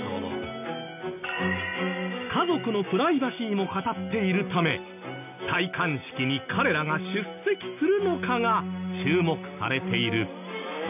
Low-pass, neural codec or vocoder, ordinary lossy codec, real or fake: 3.6 kHz; none; none; real